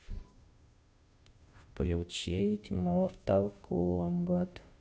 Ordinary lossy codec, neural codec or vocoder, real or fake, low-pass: none; codec, 16 kHz, 0.5 kbps, FunCodec, trained on Chinese and English, 25 frames a second; fake; none